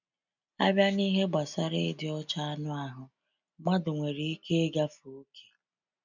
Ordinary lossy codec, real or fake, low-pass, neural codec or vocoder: none; real; 7.2 kHz; none